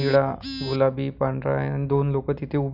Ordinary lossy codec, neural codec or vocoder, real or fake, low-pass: none; none; real; 5.4 kHz